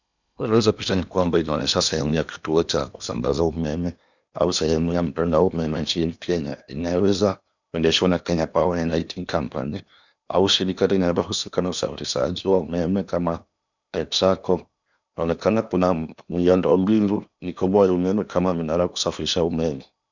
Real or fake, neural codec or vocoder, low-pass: fake; codec, 16 kHz in and 24 kHz out, 0.8 kbps, FocalCodec, streaming, 65536 codes; 7.2 kHz